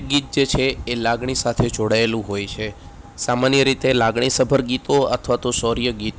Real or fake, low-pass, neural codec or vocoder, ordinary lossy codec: real; none; none; none